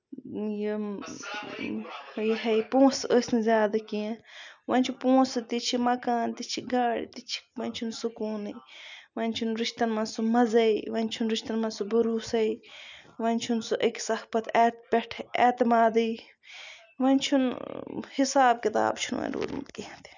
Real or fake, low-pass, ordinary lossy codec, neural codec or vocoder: real; 7.2 kHz; none; none